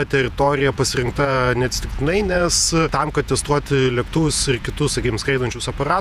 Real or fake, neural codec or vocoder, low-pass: fake; vocoder, 48 kHz, 128 mel bands, Vocos; 14.4 kHz